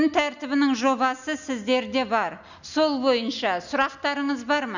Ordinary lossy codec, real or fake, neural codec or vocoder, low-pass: none; real; none; 7.2 kHz